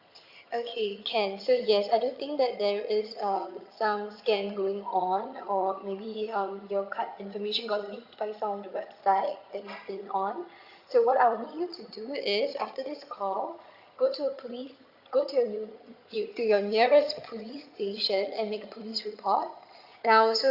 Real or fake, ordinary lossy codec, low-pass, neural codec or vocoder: fake; Opus, 64 kbps; 5.4 kHz; vocoder, 22.05 kHz, 80 mel bands, HiFi-GAN